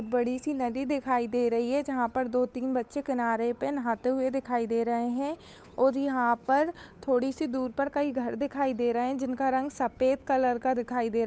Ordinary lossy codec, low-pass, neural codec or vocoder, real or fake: none; none; codec, 16 kHz, 8 kbps, FunCodec, trained on Chinese and English, 25 frames a second; fake